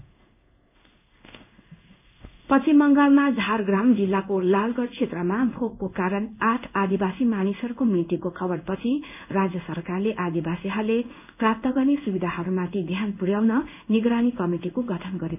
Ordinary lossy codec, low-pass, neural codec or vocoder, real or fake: none; 3.6 kHz; codec, 16 kHz in and 24 kHz out, 1 kbps, XY-Tokenizer; fake